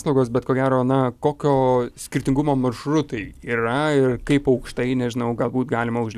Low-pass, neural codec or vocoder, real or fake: 14.4 kHz; none; real